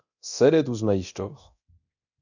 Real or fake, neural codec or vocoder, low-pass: fake; codec, 24 kHz, 0.9 kbps, DualCodec; 7.2 kHz